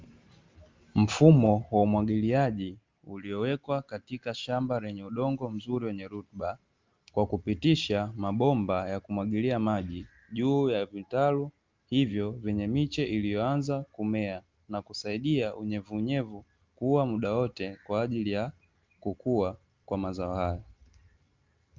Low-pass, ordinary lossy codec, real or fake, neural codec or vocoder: 7.2 kHz; Opus, 32 kbps; real; none